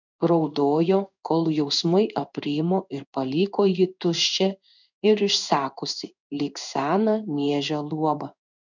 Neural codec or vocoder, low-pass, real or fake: codec, 16 kHz in and 24 kHz out, 1 kbps, XY-Tokenizer; 7.2 kHz; fake